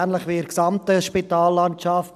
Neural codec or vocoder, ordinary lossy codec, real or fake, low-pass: none; none; real; 14.4 kHz